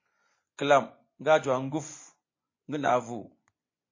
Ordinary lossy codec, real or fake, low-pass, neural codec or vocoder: MP3, 32 kbps; fake; 7.2 kHz; vocoder, 24 kHz, 100 mel bands, Vocos